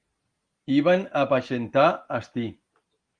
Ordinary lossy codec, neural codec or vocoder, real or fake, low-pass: Opus, 24 kbps; none; real; 9.9 kHz